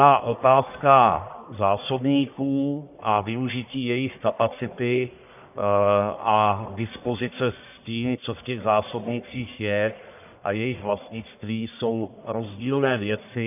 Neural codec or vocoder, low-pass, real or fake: codec, 44.1 kHz, 1.7 kbps, Pupu-Codec; 3.6 kHz; fake